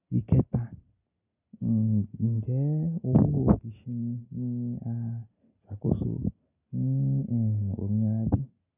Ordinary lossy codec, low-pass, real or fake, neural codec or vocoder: none; 3.6 kHz; real; none